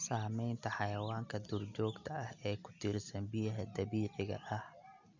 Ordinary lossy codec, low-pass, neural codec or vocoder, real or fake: none; 7.2 kHz; none; real